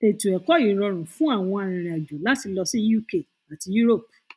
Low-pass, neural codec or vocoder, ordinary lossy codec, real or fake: none; none; none; real